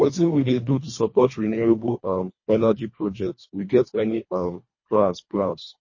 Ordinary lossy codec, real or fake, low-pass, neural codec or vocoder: MP3, 32 kbps; fake; 7.2 kHz; codec, 24 kHz, 1.5 kbps, HILCodec